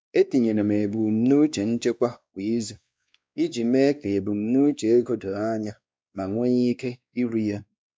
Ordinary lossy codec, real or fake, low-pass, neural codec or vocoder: none; fake; none; codec, 16 kHz, 2 kbps, X-Codec, WavLM features, trained on Multilingual LibriSpeech